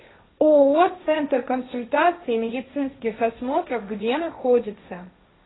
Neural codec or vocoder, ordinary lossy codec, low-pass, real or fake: codec, 16 kHz, 1.1 kbps, Voila-Tokenizer; AAC, 16 kbps; 7.2 kHz; fake